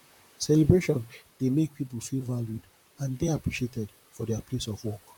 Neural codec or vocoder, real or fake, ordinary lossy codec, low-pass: vocoder, 44.1 kHz, 128 mel bands, Pupu-Vocoder; fake; none; 19.8 kHz